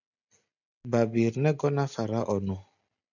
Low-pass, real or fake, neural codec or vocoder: 7.2 kHz; real; none